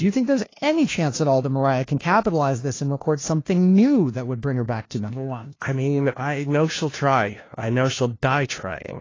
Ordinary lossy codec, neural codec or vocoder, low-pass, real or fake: AAC, 32 kbps; codec, 16 kHz, 1 kbps, FunCodec, trained on LibriTTS, 50 frames a second; 7.2 kHz; fake